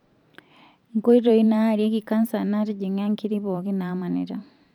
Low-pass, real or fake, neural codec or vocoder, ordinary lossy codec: 19.8 kHz; fake; vocoder, 44.1 kHz, 128 mel bands every 512 samples, BigVGAN v2; none